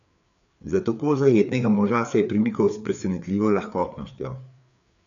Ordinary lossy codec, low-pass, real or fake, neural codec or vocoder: none; 7.2 kHz; fake; codec, 16 kHz, 4 kbps, FreqCodec, larger model